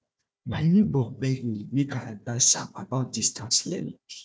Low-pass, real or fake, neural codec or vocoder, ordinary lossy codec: none; fake; codec, 16 kHz, 1 kbps, FunCodec, trained on Chinese and English, 50 frames a second; none